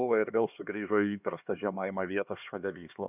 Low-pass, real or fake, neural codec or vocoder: 3.6 kHz; fake; codec, 16 kHz, 2 kbps, X-Codec, HuBERT features, trained on LibriSpeech